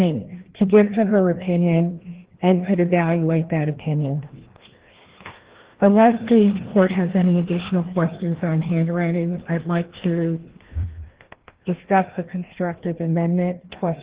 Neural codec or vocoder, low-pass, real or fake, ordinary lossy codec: codec, 16 kHz, 1 kbps, FreqCodec, larger model; 3.6 kHz; fake; Opus, 16 kbps